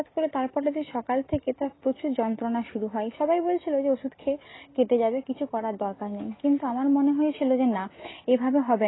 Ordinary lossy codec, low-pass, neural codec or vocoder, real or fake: AAC, 16 kbps; 7.2 kHz; none; real